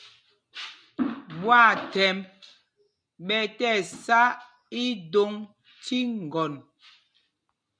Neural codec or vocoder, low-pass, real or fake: none; 9.9 kHz; real